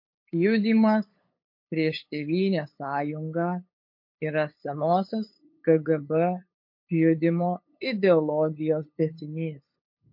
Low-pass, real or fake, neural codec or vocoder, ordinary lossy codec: 5.4 kHz; fake; codec, 16 kHz, 8 kbps, FunCodec, trained on LibriTTS, 25 frames a second; MP3, 32 kbps